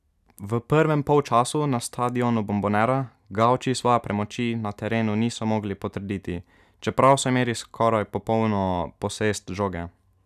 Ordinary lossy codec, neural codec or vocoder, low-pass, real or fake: none; none; 14.4 kHz; real